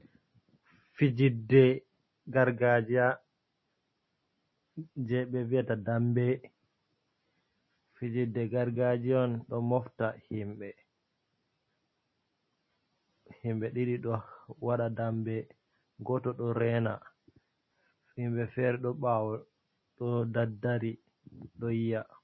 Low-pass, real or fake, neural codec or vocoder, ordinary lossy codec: 7.2 kHz; real; none; MP3, 24 kbps